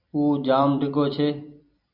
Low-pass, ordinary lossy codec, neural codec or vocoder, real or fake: 5.4 kHz; MP3, 48 kbps; vocoder, 44.1 kHz, 128 mel bands every 256 samples, BigVGAN v2; fake